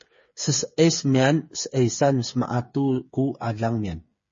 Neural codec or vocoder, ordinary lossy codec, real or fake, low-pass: codec, 16 kHz, 8 kbps, FreqCodec, smaller model; MP3, 32 kbps; fake; 7.2 kHz